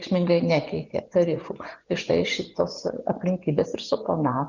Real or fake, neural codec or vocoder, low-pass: fake; vocoder, 24 kHz, 100 mel bands, Vocos; 7.2 kHz